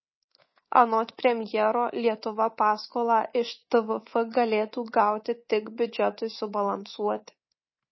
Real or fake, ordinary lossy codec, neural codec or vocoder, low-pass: fake; MP3, 24 kbps; codec, 24 kHz, 3.1 kbps, DualCodec; 7.2 kHz